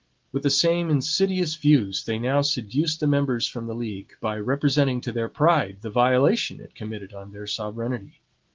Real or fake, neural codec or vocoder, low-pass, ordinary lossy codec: real; none; 7.2 kHz; Opus, 16 kbps